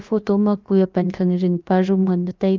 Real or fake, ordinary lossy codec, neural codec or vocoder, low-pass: fake; Opus, 32 kbps; codec, 16 kHz, about 1 kbps, DyCAST, with the encoder's durations; 7.2 kHz